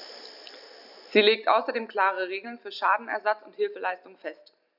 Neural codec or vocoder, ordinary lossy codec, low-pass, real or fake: none; none; 5.4 kHz; real